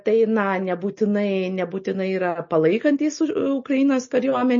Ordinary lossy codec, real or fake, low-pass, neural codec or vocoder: MP3, 32 kbps; real; 7.2 kHz; none